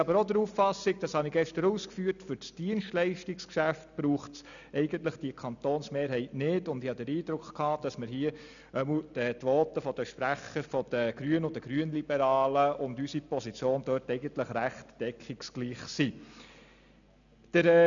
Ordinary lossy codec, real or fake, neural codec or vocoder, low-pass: none; real; none; 7.2 kHz